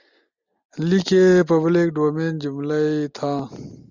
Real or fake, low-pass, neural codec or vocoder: real; 7.2 kHz; none